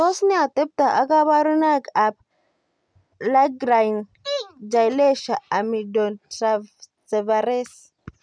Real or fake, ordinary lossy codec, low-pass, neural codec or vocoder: real; none; 9.9 kHz; none